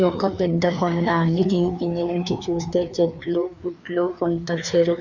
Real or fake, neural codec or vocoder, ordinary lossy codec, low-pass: fake; codec, 16 kHz, 2 kbps, FreqCodec, larger model; none; 7.2 kHz